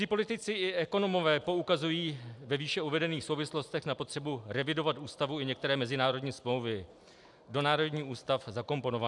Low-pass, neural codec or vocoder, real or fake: 10.8 kHz; none; real